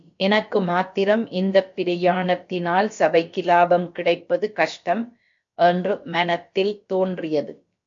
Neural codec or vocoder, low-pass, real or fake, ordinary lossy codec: codec, 16 kHz, about 1 kbps, DyCAST, with the encoder's durations; 7.2 kHz; fake; MP3, 48 kbps